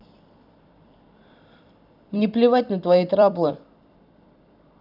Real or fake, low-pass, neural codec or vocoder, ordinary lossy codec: fake; 5.4 kHz; vocoder, 44.1 kHz, 128 mel bands every 256 samples, BigVGAN v2; none